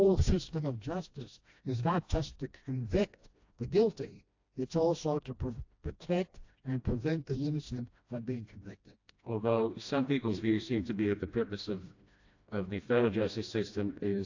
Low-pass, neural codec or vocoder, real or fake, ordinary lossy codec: 7.2 kHz; codec, 16 kHz, 1 kbps, FreqCodec, smaller model; fake; AAC, 48 kbps